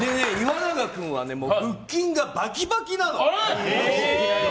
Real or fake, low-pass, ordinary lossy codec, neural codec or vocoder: real; none; none; none